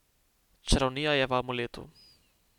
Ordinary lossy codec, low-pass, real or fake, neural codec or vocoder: none; 19.8 kHz; real; none